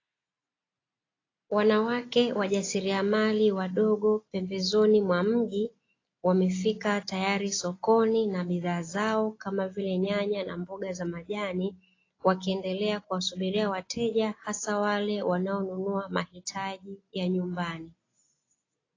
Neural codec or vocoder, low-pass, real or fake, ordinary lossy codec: none; 7.2 kHz; real; AAC, 32 kbps